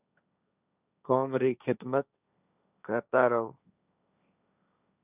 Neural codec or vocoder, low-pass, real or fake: codec, 16 kHz, 1.1 kbps, Voila-Tokenizer; 3.6 kHz; fake